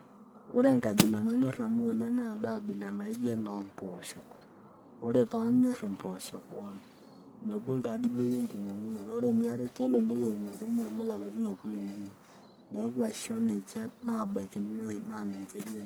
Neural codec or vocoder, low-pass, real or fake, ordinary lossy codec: codec, 44.1 kHz, 1.7 kbps, Pupu-Codec; none; fake; none